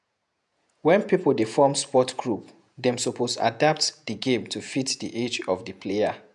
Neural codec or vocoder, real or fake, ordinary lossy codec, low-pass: vocoder, 48 kHz, 128 mel bands, Vocos; fake; none; 10.8 kHz